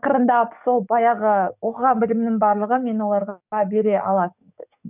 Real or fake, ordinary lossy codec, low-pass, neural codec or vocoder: fake; none; 3.6 kHz; codec, 44.1 kHz, 7.8 kbps, DAC